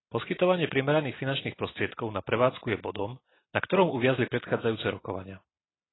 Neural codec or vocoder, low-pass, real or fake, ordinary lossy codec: none; 7.2 kHz; real; AAC, 16 kbps